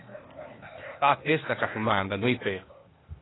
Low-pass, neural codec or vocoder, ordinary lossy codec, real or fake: 7.2 kHz; codec, 16 kHz, 0.8 kbps, ZipCodec; AAC, 16 kbps; fake